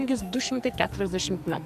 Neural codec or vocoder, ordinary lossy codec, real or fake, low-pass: codec, 44.1 kHz, 2.6 kbps, SNAC; MP3, 96 kbps; fake; 14.4 kHz